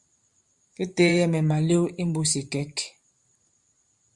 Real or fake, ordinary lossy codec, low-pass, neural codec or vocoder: fake; Opus, 64 kbps; 10.8 kHz; vocoder, 44.1 kHz, 128 mel bands every 512 samples, BigVGAN v2